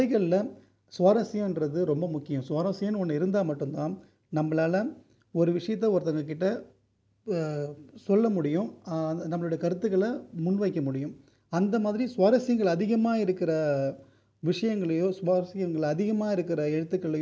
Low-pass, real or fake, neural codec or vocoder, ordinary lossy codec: none; real; none; none